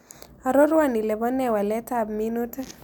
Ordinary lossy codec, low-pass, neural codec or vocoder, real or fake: none; none; none; real